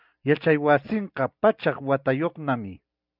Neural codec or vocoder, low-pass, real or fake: none; 5.4 kHz; real